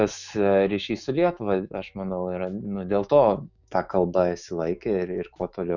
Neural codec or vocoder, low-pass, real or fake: autoencoder, 48 kHz, 128 numbers a frame, DAC-VAE, trained on Japanese speech; 7.2 kHz; fake